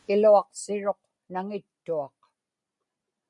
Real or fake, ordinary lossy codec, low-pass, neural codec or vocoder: real; MP3, 64 kbps; 10.8 kHz; none